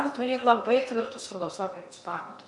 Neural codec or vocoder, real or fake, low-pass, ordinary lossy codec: codec, 16 kHz in and 24 kHz out, 0.8 kbps, FocalCodec, streaming, 65536 codes; fake; 10.8 kHz; MP3, 64 kbps